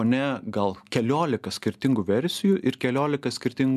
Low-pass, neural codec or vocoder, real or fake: 14.4 kHz; none; real